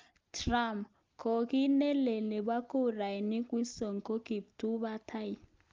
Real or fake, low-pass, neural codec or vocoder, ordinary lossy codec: real; 7.2 kHz; none; Opus, 32 kbps